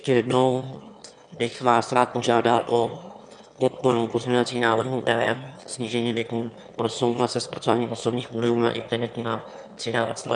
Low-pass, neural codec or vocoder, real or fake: 9.9 kHz; autoencoder, 22.05 kHz, a latent of 192 numbers a frame, VITS, trained on one speaker; fake